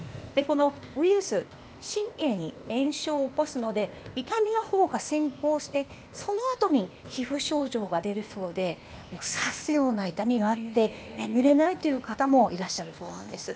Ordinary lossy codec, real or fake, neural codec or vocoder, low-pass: none; fake; codec, 16 kHz, 0.8 kbps, ZipCodec; none